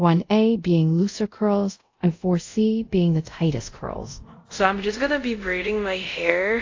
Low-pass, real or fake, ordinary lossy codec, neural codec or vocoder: 7.2 kHz; fake; AAC, 48 kbps; codec, 24 kHz, 0.5 kbps, DualCodec